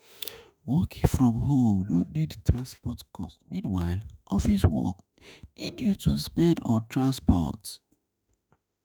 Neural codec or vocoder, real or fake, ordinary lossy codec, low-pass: autoencoder, 48 kHz, 32 numbers a frame, DAC-VAE, trained on Japanese speech; fake; none; none